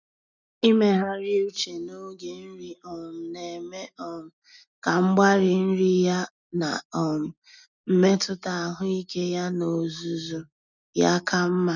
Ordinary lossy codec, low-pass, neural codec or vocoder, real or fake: none; 7.2 kHz; none; real